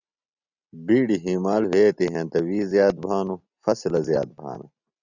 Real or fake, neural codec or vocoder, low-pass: real; none; 7.2 kHz